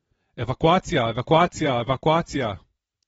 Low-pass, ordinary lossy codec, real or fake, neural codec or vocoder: 9.9 kHz; AAC, 24 kbps; fake; vocoder, 22.05 kHz, 80 mel bands, WaveNeXt